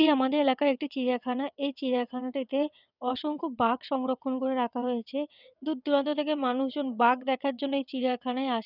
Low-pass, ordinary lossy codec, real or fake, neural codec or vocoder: 5.4 kHz; none; fake; vocoder, 22.05 kHz, 80 mel bands, WaveNeXt